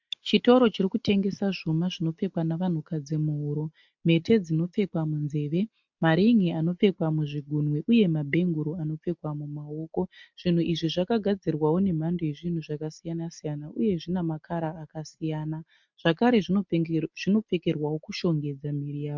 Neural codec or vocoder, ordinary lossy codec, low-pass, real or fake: none; MP3, 64 kbps; 7.2 kHz; real